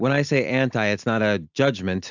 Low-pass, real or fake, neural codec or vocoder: 7.2 kHz; real; none